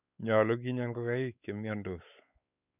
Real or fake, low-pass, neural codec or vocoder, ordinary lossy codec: fake; 3.6 kHz; codec, 16 kHz, 4 kbps, X-Codec, WavLM features, trained on Multilingual LibriSpeech; none